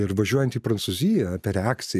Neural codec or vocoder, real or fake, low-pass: none; real; 14.4 kHz